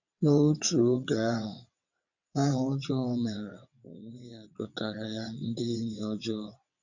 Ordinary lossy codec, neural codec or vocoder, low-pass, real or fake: none; vocoder, 22.05 kHz, 80 mel bands, WaveNeXt; 7.2 kHz; fake